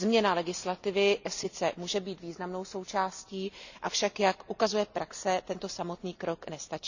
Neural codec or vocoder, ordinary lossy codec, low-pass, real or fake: none; none; 7.2 kHz; real